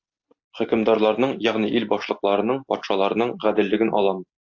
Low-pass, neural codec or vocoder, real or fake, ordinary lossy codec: 7.2 kHz; none; real; AAC, 48 kbps